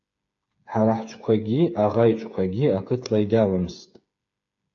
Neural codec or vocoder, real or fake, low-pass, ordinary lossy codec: codec, 16 kHz, 8 kbps, FreqCodec, smaller model; fake; 7.2 kHz; AAC, 48 kbps